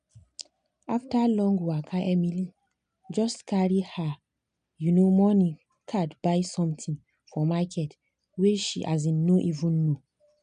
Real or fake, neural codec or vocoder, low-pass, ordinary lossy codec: real; none; 9.9 kHz; none